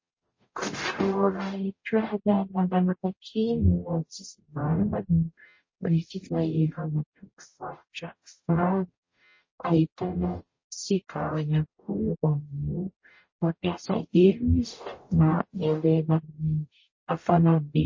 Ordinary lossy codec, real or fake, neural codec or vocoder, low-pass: MP3, 32 kbps; fake; codec, 44.1 kHz, 0.9 kbps, DAC; 7.2 kHz